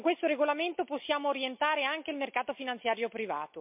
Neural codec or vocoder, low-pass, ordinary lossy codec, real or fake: none; 3.6 kHz; MP3, 32 kbps; real